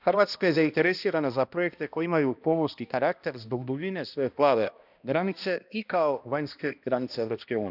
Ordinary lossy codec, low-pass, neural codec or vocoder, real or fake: none; 5.4 kHz; codec, 16 kHz, 1 kbps, X-Codec, HuBERT features, trained on balanced general audio; fake